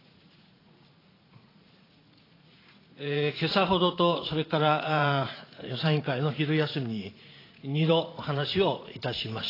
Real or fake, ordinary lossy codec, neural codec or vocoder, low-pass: fake; AAC, 24 kbps; vocoder, 44.1 kHz, 80 mel bands, Vocos; 5.4 kHz